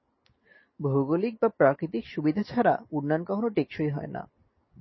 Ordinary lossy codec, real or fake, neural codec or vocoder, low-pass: MP3, 24 kbps; real; none; 7.2 kHz